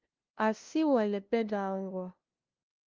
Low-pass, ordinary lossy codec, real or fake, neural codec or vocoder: 7.2 kHz; Opus, 32 kbps; fake; codec, 16 kHz, 0.5 kbps, FunCodec, trained on LibriTTS, 25 frames a second